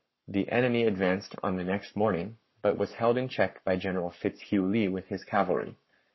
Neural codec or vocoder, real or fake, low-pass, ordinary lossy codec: codec, 44.1 kHz, 7.8 kbps, Pupu-Codec; fake; 7.2 kHz; MP3, 24 kbps